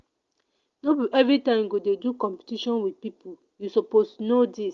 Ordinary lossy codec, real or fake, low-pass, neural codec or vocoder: Opus, 32 kbps; real; 7.2 kHz; none